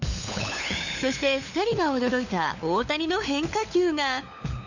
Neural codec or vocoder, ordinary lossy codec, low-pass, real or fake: codec, 16 kHz, 4 kbps, FunCodec, trained on LibriTTS, 50 frames a second; none; 7.2 kHz; fake